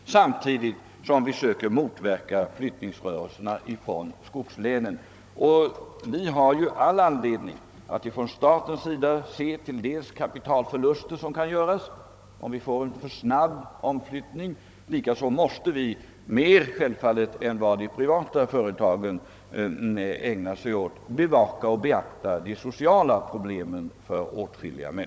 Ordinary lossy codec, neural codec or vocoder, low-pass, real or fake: none; codec, 16 kHz, 16 kbps, FunCodec, trained on Chinese and English, 50 frames a second; none; fake